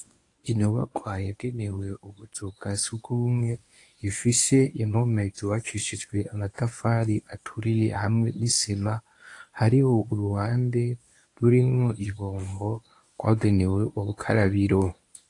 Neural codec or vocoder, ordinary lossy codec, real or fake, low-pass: codec, 24 kHz, 0.9 kbps, WavTokenizer, medium speech release version 1; AAC, 48 kbps; fake; 10.8 kHz